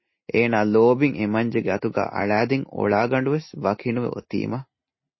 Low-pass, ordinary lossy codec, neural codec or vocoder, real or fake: 7.2 kHz; MP3, 24 kbps; none; real